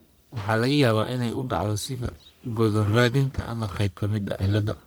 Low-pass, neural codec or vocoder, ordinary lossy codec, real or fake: none; codec, 44.1 kHz, 1.7 kbps, Pupu-Codec; none; fake